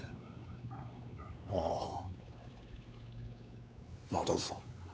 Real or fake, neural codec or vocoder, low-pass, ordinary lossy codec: fake; codec, 16 kHz, 4 kbps, X-Codec, WavLM features, trained on Multilingual LibriSpeech; none; none